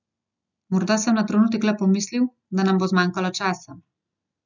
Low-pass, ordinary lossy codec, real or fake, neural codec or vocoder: 7.2 kHz; none; real; none